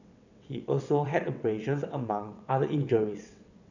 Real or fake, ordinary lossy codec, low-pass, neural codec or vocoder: fake; none; 7.2 kHz; vocoder, 22.05 kHz, 80 mel bands, WaveNeXt